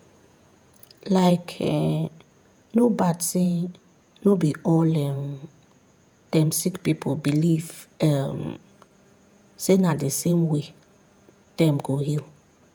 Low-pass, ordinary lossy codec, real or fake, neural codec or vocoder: none; none; fake; vocoder, 48 kHz, 128 mel bands, Vocos